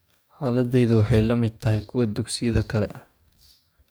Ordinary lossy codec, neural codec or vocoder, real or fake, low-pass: none; codec, 44.1 kHz, 2.6 kbps, DAC; fake; none